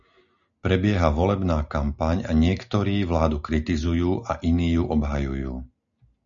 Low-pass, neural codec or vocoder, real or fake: 7.2 kHz; none; real